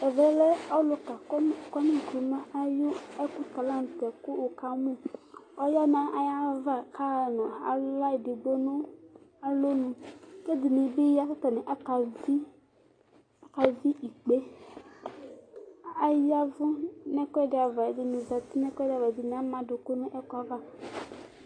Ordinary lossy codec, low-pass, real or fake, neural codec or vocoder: AAC, 32 kbps; 9.9 kHz; real; none